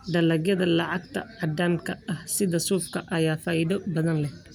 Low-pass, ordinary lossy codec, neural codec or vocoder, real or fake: none; none; none; real